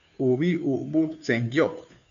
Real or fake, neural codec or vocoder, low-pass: fake; codec, 16 kHz, 2 kbps, FunCodec, trained on Chinese and English, 25 frames a second; 7.2 kHz